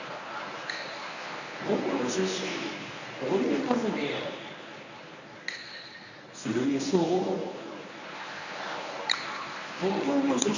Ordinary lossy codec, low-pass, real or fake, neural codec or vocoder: none; 7.2 kHz; fake; codec, 24 kHz, 0.9 kbps, WavTokenizer, medium speech release version 1